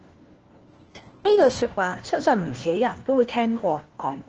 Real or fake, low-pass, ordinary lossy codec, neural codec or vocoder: fake; 7.2 kHz; Opus, 16 kbps; codec, 16 kHz, 1 kbps, FunCodec, trained on LibriTTS, 50 frames a second